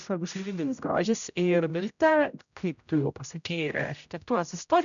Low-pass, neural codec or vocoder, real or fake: 7.2 kHz; codec, 16 kHz, 0.5 kbps, X-Codec, HuBERT features, trained on general audio; fake